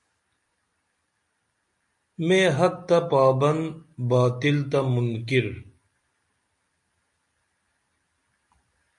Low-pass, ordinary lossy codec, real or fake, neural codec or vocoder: 10.8 kHz; MP3, 48 kbps; real; none